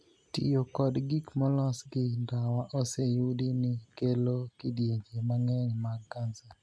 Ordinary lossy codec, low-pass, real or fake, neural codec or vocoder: none; none; real; none